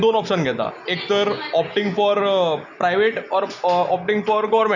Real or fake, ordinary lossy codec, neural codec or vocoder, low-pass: real; none; none; 7.2 kHz